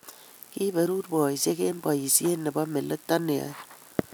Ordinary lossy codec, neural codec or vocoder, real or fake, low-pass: none; none; real; none